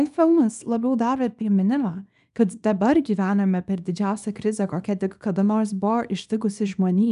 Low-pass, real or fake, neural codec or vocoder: 10.8 kHz; fake; codec, 24 kHz, 0.9 kbps, WavTokenizer, small release